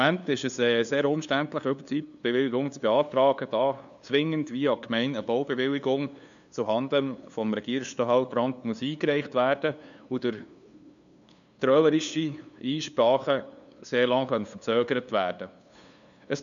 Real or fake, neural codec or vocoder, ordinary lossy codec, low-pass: fake; codec, 16 kHz, 2 kbps, FunCodec, trained on LibriTTS, 25 frames a second; none; 7.2 kHz